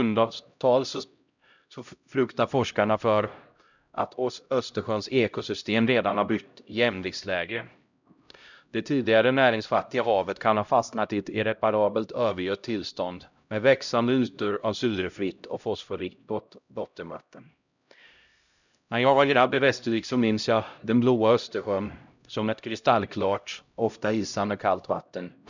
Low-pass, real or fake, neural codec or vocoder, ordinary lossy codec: 7.2 kHz; fake; codec, 16 kHz, 0.5 kbps, X-Codec, HuBERT features, trained on LibriSpeech; none